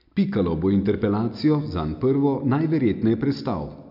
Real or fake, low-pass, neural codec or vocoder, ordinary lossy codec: real; 5.4 kHz; none; none